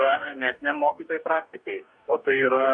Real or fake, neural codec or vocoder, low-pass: fake; codec, 44.1 kHz, 2.6 kbps, DAC; 9.9 kHz